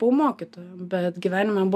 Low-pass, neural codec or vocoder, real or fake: 14.4 kHz; none; real